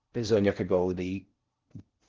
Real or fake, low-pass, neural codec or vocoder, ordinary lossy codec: fake; 7.2 kHz; codec, 16 kHz in and 24 kHz out, 0.6 kbps, FocalCodec, streaming, 4096 codes; Opus, 16 kbps